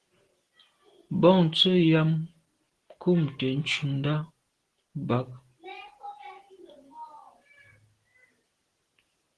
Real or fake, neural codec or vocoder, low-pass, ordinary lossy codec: real; none; 10.8 kHz; Opus, 16 kbps